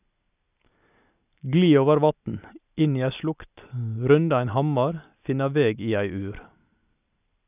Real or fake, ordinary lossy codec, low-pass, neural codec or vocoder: real; none; 3.6 kHz; none